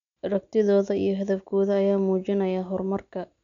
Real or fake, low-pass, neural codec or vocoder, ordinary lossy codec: real; 7.2 kHz; none; none